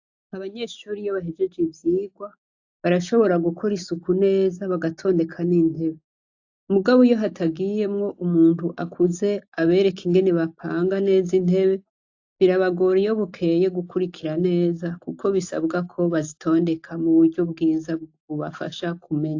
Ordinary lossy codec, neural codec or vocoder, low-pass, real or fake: AAC, 48 kbps; none; 7.2 kHz; real